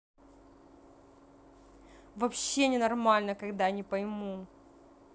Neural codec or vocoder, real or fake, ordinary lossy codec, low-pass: none; real; none; none